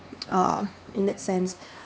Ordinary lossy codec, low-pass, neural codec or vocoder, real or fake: none; none; codec, 16 kHz, 2 kbps, X-Codec, HuBERT features, trained on LibriSpeech; fake